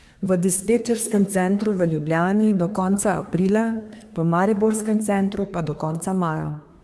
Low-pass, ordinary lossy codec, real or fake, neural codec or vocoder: none; none; fake; codec, 24 kHz, 1 kbps, SNAC